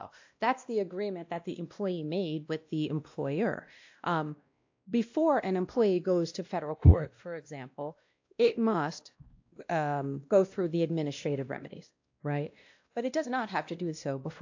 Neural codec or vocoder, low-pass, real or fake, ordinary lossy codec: codec, 16 kHz, 1 kbps, X-Codec, WavLM features, trained on Multilingual LibriSpeech; 7.2 kHz; fake; AAC, 48 kbps